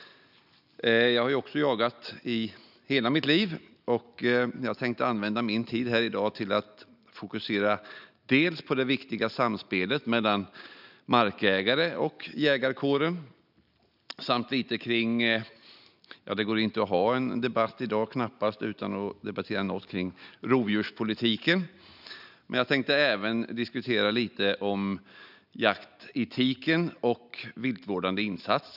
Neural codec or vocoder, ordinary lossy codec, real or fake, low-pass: none; none; real; 5.4 kHz